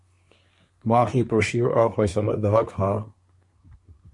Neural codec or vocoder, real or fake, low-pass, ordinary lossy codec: codec, 24 kHz, 1 kbps, SNAC; fake; 10.8 kHz; MP3, 48 kbps